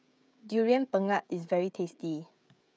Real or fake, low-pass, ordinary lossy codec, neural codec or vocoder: fake; none; none; codec, 16 kHz, 16 kbps, FreqCodec, smaller model